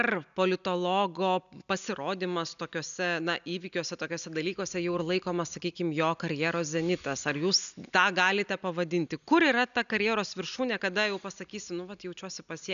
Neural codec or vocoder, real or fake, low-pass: none; real; 7.2 kHz